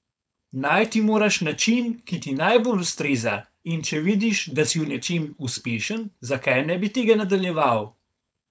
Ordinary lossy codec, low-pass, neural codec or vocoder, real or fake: none; none; codec, 16 kHz, 4.8 kbps, FACodec; fake